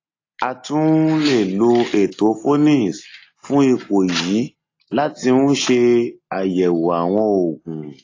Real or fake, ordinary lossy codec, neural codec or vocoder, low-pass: real; AAC, 32 kbps; none; 7.2 kHz